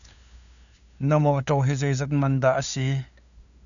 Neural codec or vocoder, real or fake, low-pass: codec, 16 kHz, 2 kbps, FunCodec, trained on LibriTTS, 25 frames a second; fake; 7.2 kHz